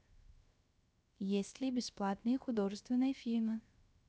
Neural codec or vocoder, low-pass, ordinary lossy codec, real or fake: codec, 16 kHz, 0.3 kbps, FocalCodec; none; none; fake